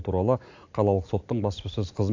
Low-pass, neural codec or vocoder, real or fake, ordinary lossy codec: 7.2 kHz; vocoder, 44.1 kHz, 128 mel bands every 256 samples, BigVGAN v2; fake; AAC, 48 kbps